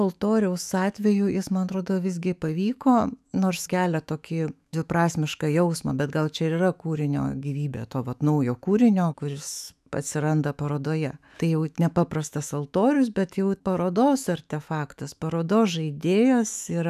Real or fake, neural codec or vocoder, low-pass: fake; autoencoder, 48 kHz, 128 numbers a frame, DAC-VAE, trained on Japanese speech; 14.4 kHz